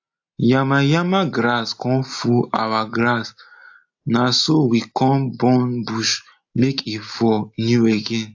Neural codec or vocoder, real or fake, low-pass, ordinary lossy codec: none; real; 7.2 kHz; AAC, 48 kbps